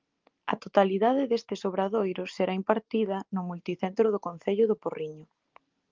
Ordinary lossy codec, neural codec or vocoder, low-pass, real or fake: Opus, 32 kbps; none; 7.2 kHz; real